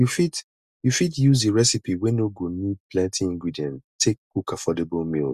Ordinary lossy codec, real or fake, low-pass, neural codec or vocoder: Opus, 64 kbps; real; 14.4 kHz; none